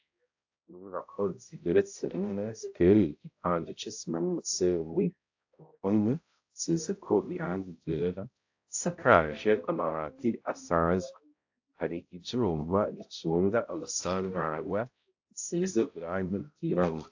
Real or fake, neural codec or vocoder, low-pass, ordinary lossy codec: fake; codec, 16 kHz, 0.5 kbps, X-Codec, HuBERT features, trained on balanced general audio; 7.2 kHz; AAC, 48 kbps